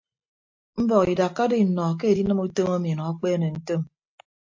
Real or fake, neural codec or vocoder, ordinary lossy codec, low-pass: real; none; MP3, 48 kbps; 7.2 kHz